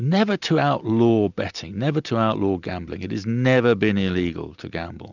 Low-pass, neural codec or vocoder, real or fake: 7.2 kHz; none; real